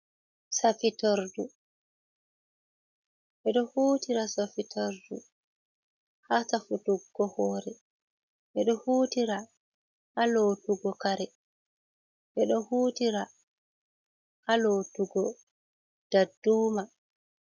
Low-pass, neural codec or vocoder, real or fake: 7.2 kHz; none; real